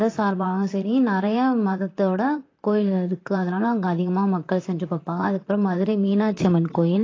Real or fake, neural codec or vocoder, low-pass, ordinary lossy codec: fake; vocoder, 44.1 kHz, 128 mel bands, Pupu-Vocoder; 7.2 kHz; AAC, 32 kbps